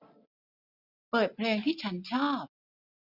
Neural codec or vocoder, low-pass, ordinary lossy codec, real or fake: none; 5.4 kHz; none; real